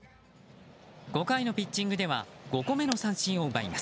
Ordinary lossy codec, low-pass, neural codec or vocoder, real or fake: none; none; none; real